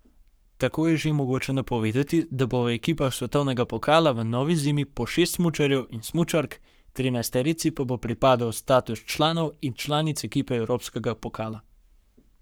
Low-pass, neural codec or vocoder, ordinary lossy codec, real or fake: none; codec, 44.1 kHz, 7.8 kbps, Pupu-Codec; none; fake